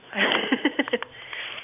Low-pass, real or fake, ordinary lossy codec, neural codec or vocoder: 3.6 kHz; real; none; none